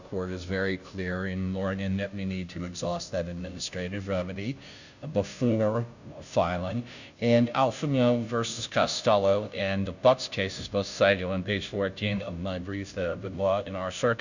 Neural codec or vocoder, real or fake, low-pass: codec, 16 kHz, 0.5 kbps, FunCodec, trained on Chinese and English, 25 frames a second; fake; 7.2 kHz